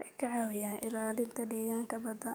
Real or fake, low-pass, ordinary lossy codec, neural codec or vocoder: fake; none; none; codec, 44.1 kHz, 7.8 kbps, DAC